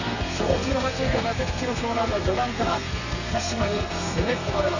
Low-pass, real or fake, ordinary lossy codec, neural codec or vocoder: 7.2 kHz; fake; none; codec, 32 kHz, 1.9 kbps, SNAC